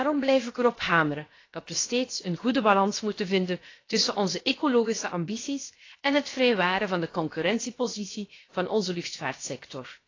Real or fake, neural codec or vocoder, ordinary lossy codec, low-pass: fake; codec, 16 kHz, 0.7 kbps, FocalCodec; AAC, 32 kbps; 7.2 kHz